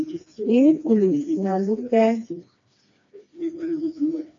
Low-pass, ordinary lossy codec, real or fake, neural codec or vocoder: 7.2 kHz; AAC, 48 kbps; fake; codec, 16 kHz, 2 kbps, FreqCodec, smaller model